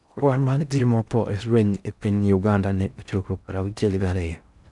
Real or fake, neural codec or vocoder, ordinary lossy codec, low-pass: fake; codec, 16 kHz in and 24 kHz out, 0.6 kbps, FocalCodec, streaming, 2048 codes; none; 10.8 kHz